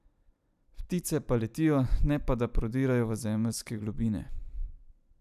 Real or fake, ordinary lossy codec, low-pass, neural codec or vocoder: fake; none; 14.4 kHz; vocoder, 44.1 kHz, 128 mel bands every 256 samples, BigVGAN v2